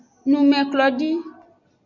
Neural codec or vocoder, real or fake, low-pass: none; real; 7.2 kHz